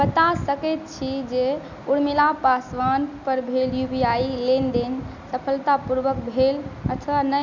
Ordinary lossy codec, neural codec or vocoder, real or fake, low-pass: none; none; real; 7.2 kHz